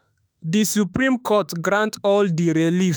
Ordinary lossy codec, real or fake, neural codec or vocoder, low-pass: none; fake; autoencoder, 48 kHz, 32 numbers a frame, DAC-VAE, trained on Japanese speech; none